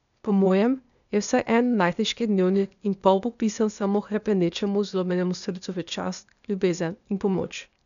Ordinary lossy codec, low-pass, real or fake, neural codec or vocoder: none; 7.2 kHz; fake; codec, 16 kHz, 0.8 kbps, ZipCodec